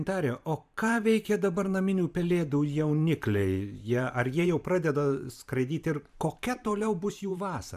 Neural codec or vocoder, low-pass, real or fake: vocoder, 44.1 kHz, 128 mel bands every 512 samples, BigVGAN v2; 14.4 kHz; fake